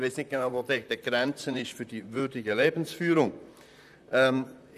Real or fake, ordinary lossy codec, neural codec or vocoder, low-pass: fake; none; vocoder, 44.1 kHz, 128 mel bands, Pupu-Vocoder; 14.4 kHz